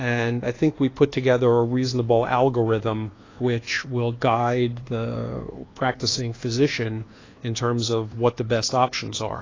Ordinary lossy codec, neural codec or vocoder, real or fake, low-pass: AAC, 32 kbps; codec, 24 kHz, 1.2 kbps, DualCodec; fake; 7.2 kHz